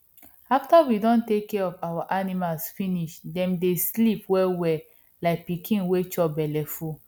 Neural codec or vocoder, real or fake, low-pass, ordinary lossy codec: none; real; 19.8 kHz; none